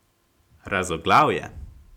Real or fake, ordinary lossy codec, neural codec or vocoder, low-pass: real; none; none; 19.8 kHz